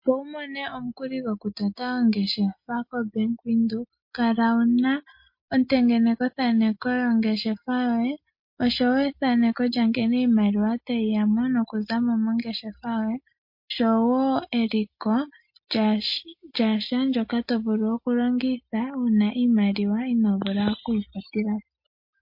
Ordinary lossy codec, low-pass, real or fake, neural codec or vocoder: MP3, 32 kbps; 5.4 kHz; real; none